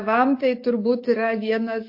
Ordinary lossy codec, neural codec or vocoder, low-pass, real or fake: MP3, 32 kbps; codec, 44.1 kHz, 7.8 kbps, DAC; 5.4 kHz; fake